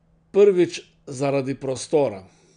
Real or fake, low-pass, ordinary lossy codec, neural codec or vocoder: real; 9.9 kHz; none; none